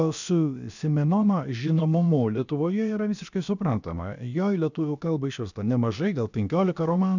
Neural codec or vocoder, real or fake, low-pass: codec, 16 kHz, about 1 kbps, DyCAST, with the encoder's durations; fake; 7.2 kHz